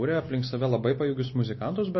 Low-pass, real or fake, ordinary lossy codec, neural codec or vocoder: 7.2 kHz; real; MP3, 24 kbps; none